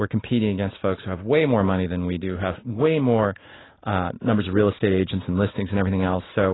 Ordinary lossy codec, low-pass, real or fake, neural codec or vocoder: AAC, 16 kbps; 7.2 kHz; real; none